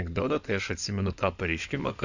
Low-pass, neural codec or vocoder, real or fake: 7.2 kHz; codec, 16 kHz in and 24 kHz out, 2.2 kbps, FireRedTTS-2 codec; fake